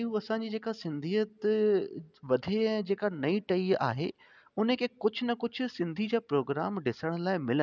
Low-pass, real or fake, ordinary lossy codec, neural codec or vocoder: 7.2 kHz; real; none; none